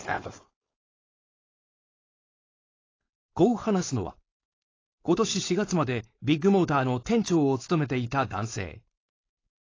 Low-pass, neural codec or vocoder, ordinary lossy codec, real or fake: 7.2 kHz; codec, 16 kHz, 4.8 kbps, FACodec; AAC, 32 kbps; fake